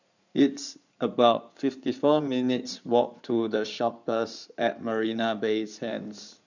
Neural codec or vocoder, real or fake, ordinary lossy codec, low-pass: codec, 16 kHz in and 24 kHz out, 2.2 kbps, FireRedTTS-2 codec; fake; none; 7.2 kHz